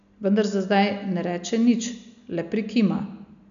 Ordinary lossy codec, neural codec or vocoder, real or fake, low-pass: none; none; real; 7.2 kHz